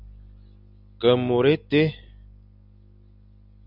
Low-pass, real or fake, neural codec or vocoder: 5.4 kHz; real; none